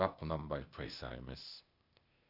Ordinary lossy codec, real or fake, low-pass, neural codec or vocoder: none; fake; 5.4 kHz; codec, 16 kHz, 0.8 kbps, ZipCodec